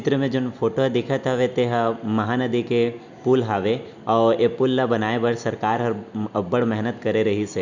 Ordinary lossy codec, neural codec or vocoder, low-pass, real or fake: none; none; 7.2 kHz; real